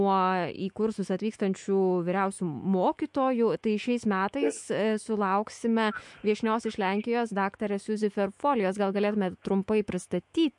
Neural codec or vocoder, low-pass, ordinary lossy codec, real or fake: autoencoder, 48 kHz, 128 numbers a frame, DAC-VAE, trained on Japanese speech; 10.8 kHz; MP3, 64 kbps; fake